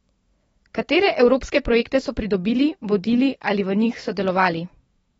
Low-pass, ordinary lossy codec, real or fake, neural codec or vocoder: 19.8 kHz; AAC, 24 kbps; fake; autoencoder, 48 kHz, 128 numbers a frame, DAC-VAE, trained on Japanese speech